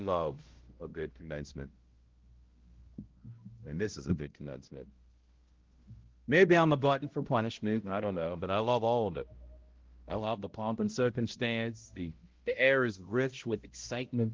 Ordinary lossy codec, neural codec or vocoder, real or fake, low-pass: Opus, 16 kbps; codec, 16 kHz, 0.5 kbps, X-Codec, HuBERT features, trained on balanced general audio; fake; 7.2 kHz